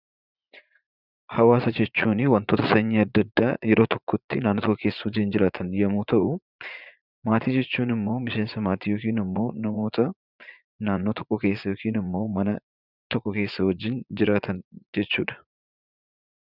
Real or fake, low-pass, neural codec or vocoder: fake; 5.4 kHz; vocoder, 44.1 kHz, 80 mel bands, Vocos